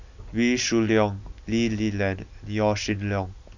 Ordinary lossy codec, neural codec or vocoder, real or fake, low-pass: none; none; real; 7.2 kHz